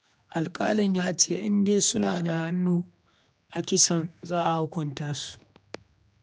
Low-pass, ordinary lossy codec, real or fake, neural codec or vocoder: none; none; fake; codec, 16 kHz, 2 kbps, X-Codec, HuBERT features, trained on general audio